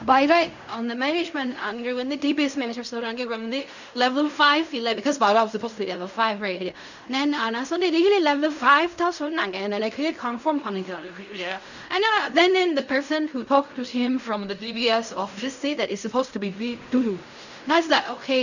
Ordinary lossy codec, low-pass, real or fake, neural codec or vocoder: none; 7.2 kHz; fake; codec, 16 kHz in and 24 kHz out, 0.4 kbps, LongCat-Audio-Codec, fine tuned four codebook decoder